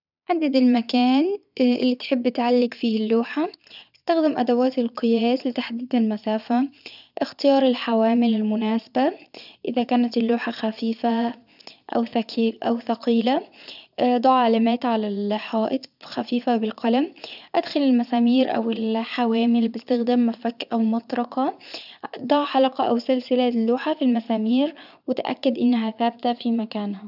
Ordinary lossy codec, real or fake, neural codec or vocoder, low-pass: none; fake; vocoder, 22.05 kHz, 80 mel bands, Vocos; 5.4 kHz